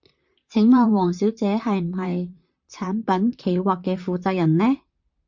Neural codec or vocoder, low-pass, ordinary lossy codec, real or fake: vocoder, 44.1 kHz, 128 mel bands, Pupu-Vocoder; 7.2 kHz; MP3, 64 kbps; fake